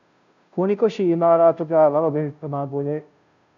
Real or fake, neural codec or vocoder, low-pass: fake; codec, 16 kHz, 0.5 kbps, FunCodec, trained on Chinese and English, 25 frames a second; 7.2 kHz